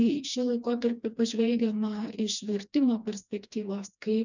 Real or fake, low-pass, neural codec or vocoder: fake; 7.2 kHz; codec, 16 kHz, 2 kbps, FreqCodec, smaller model